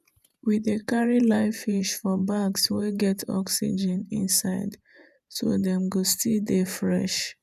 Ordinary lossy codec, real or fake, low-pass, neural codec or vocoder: none; fake; 14.4 kHz; vocoder, 48 kHz, 128 mel bands, Vocos